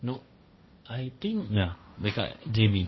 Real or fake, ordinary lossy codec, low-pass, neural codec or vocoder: fake; MP3, 24 kbps; 7.2 kHz; codec, 16 kHz, 1.1 kbps, Voila-Tokenizer